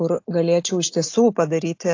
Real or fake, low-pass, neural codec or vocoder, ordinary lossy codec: real; 7.2 kHz; none; AAC, 48 kbps